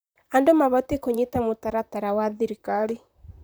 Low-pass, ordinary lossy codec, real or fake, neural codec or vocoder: none; none; fake; vocoder, 44.1 kHz, 128 mel bands, Pupu-Vocoder